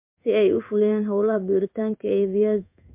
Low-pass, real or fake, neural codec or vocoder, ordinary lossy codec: 3.6 kHz; fake; codec, 16 kHz in and 24 kHz out, 1 kbps, XY-Tokenizer; MP3, 32 kbps